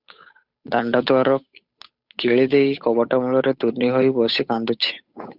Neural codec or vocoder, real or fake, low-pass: codec, 16 kHz, 8 kbps, FunCodec, trained on Chinese and English, 25 frames a second; fake; 5.4 kHz